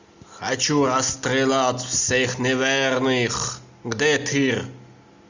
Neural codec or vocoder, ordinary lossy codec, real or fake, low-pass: none; Opus, 64 kbps; real; 7.2 kHz